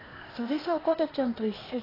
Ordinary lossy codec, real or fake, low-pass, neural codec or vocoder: AAC, 24 kbps; fake; 5.4 kHz; codec, 16 kHz, 0.8 kbps, ZipCodec